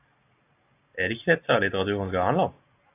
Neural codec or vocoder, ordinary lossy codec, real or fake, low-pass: none; AAC, 24 kbps; real; 3.6 kHz